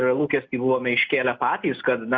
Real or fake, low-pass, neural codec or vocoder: real; 7.2 kHz; none